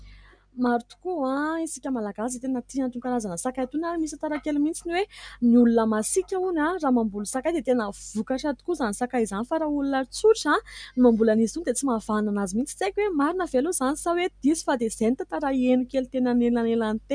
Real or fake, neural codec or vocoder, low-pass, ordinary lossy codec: real; none; 9.9 kHz; MP3, 96 kbps